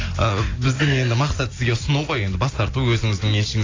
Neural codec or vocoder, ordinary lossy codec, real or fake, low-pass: none; AAC, 32 kbps; real; 7.2 kHz